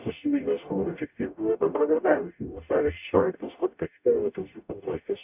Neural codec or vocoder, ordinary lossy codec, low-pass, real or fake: codec, 44.1 kHz, 0.9 kbps, DAC; AAC, 32 kbps; 3.6 kHz; fake